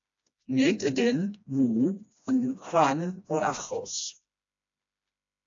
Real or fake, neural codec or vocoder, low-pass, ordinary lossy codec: fake; codec, 16 kHz, 1 kbps, FreqCodec, smaller model; 7.2 kHz; AAC, 48 kbps